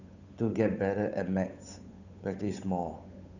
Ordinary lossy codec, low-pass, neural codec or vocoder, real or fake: none; 7.2 kHz; codec, 16 kHz, 8 kbps, FunCodec, trained on Chinese and English, 25 frames a second; fake